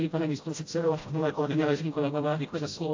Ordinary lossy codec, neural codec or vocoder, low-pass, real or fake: AAC, 48 kbps; codec, 16 kHz, 0.5 kbps, FreqCodec, smaller model; 7.2 kHz; fake